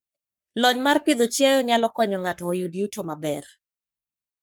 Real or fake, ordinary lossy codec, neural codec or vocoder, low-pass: fake; none; codec, 44.1 kHz, 3.4 kbps, Pupu-Codec; none